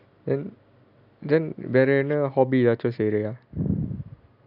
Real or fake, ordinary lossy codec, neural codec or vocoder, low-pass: real; none; none; 5.4 kHz